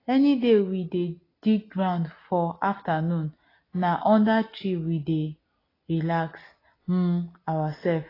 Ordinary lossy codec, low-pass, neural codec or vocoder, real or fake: AAC, 24 kbps; 5.4 kHz; none; real